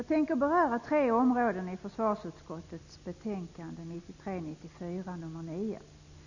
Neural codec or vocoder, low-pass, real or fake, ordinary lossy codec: none; 7.2 kHz; real; none